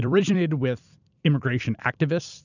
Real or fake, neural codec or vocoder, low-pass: fake; vocoder, 44.1 kHz, 128 mel bands every 256 samples, BigVGAN v2; 7.2 kHz